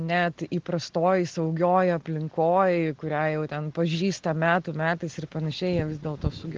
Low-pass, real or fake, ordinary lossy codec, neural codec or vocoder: 7.2 kHz; real; Opus, 16 kbps; none